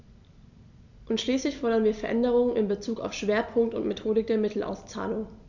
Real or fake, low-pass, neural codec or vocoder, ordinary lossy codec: real; 7.2 kHz; none; none